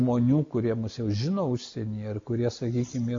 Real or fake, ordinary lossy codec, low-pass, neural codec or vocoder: real; MP3, 48 kbps; 7.2 kHz; none